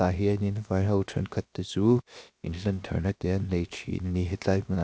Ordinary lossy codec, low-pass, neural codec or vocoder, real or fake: none; none; codec, 16 kHz, 0.7 kbps, FocalCodec; fake